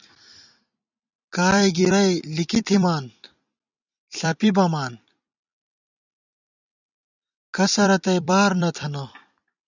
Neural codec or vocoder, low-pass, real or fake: vocoder, 44.1 kHz, 128 mel bands every 256 samples, BigVGAN v2; 7.2 kHz; fake